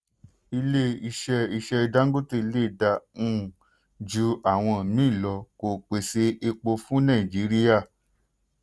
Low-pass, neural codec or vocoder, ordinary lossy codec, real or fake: none; none; none; real